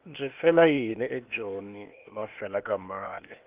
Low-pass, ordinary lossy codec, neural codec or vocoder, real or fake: 3.6 kHz; Opus, 32 kbps; codec, 16 kHz, 0.8 kbps, ZipCodec; fake